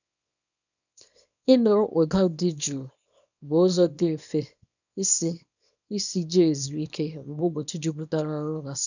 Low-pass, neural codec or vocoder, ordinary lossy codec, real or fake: 7.2 kHz; codec, 24 kHz, 0.9 kbps, WavTokenizer, small release; none; fake